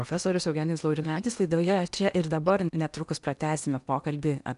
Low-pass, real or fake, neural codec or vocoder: 10.8 kHz; fake; codec, 16 kHz in and 24 kHz out, 0.8 kbps, FocalCodec, streaming, 65536 codes